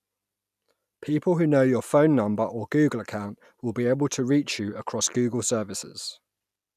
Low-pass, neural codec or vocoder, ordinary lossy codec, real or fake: 14.4 kHz; none; none; real